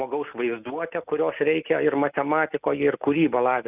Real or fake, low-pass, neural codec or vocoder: real; 3.6 kHz; none